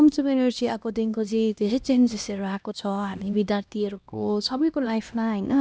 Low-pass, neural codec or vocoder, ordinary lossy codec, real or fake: none; codec, 16 kHz, 1 kbps, X-Codec, HuBERT features, trained on LibriSpeech; none; fake